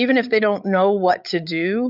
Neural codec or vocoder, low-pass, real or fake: codec, 16 kHz, 8 kbps, FreqCodec, larger model; 5.4 kHz; fake